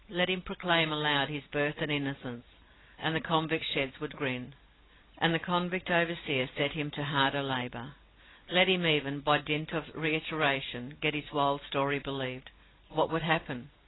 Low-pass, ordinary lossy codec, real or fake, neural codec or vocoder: 7.2 kHz; AAC, 16 kbps; real; none